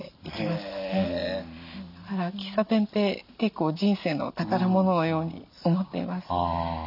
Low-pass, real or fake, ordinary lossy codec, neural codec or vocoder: 5.4 kHz; real; none; none